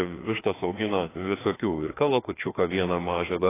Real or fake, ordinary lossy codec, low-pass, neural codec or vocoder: fake; AAC, 16 kbps; 3.6 kHz; codec, 16 kHz, 2 kbps, FreqCodec, larger model